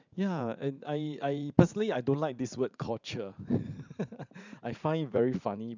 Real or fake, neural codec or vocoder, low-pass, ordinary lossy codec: fake; vocoder, 44.1 kHz, 80 mel bands, Vocos; 7.2 kHz; none